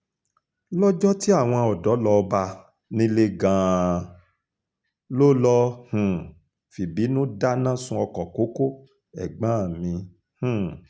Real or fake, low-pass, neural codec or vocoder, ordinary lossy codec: real; none; none; none